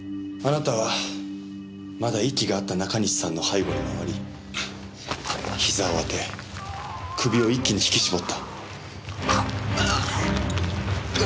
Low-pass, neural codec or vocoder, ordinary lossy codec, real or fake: none; none; none; real